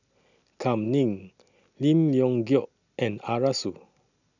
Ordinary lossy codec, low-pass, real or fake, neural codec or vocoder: none; 7.2 kHz; real; none